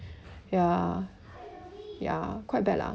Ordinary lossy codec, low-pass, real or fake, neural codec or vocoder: none; none; real; none